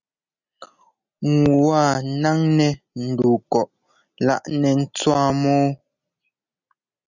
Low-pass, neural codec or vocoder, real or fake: 7.2 kHz; none; real